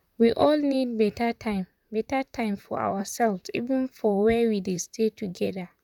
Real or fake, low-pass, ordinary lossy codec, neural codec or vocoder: fake; 19.8 kHz; none; vocoder, 44.1 kHz, 128 mel bands, Pupu-Vocoder